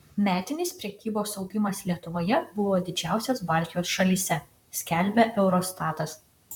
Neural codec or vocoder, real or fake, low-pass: vocoder, 44.1 kHz, 128 mel bands, Pupu-Vocoder; fake; 19.8 kHz